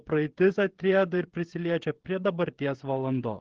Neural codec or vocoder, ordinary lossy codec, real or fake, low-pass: codec, 16 kHz, 16 kbps, FreqCodec, smaller model; Opus, 16 kbps; fake; 7.2 kHz